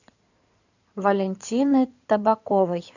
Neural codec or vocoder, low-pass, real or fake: codec, 16 kHz in and 24 kHz out, 2.2 kbps, FireRedTTS-2 codec; 7.2 kHz; fake